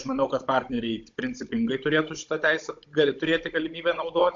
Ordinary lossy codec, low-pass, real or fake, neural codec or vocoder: AAC, 64 kbps; 7.2 kHz; fake; codec, 16 kHz, 16 kbps, FunCodec, trained on Chinese and English, 50 frames a second